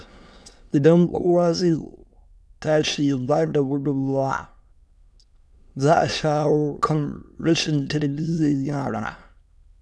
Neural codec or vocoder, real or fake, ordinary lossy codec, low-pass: autoencoder, 22.05 kHz, a latent of 192 numbers a frame, VITS, trained on many speakers; fake; none; none